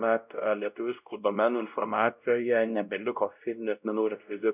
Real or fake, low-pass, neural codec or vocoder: fake; 3.6 kHz; codec, 16 kHz, 0.5 kbps, X-Codec, WavLM features, trained on Multilingual LibriSpeech